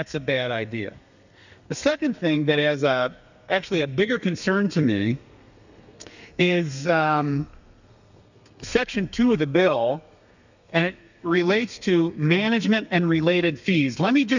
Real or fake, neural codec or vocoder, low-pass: fake; codec, 32 kHz, 1.9 kbps, SNAC; 7.2 kHz